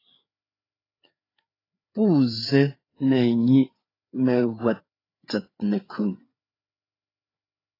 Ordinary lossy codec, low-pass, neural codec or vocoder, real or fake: AAC, 24 kbps; 5.4 kHz; codec, 16 kHz, 4 kbps, FreqCodec, larger model; fake